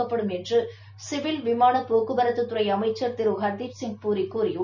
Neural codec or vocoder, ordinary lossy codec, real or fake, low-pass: none; none; real; 7.2 kHz